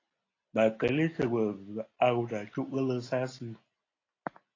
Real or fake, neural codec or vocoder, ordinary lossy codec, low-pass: real; none; AAC, 32 kbps; 7.2 kHz